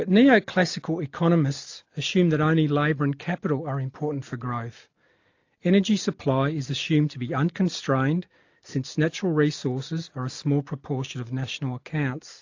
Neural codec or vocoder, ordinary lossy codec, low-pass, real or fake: none; AAC, 48 kbps; 7.2 kHz; real